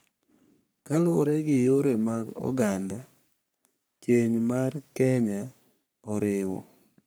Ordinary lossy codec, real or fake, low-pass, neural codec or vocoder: none; fake; none; codec, 44.1 kHz, 3.4 kbps, Pupu-Codec